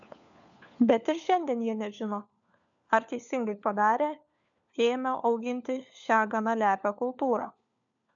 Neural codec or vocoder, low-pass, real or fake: codec, 16 kHz, 4 kbps, FunCodec, trained on LibriTTS, 50 frames a second; 7.2 kHz; fake